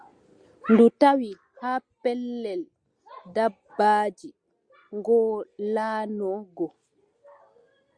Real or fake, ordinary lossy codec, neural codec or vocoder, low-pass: real; Opus, 64 kbps; none; 9.9 kHz